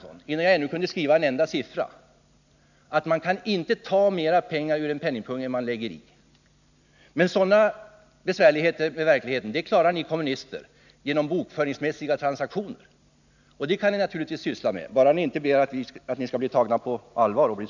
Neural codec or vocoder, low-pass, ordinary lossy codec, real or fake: none; 7.2 kHz; none; real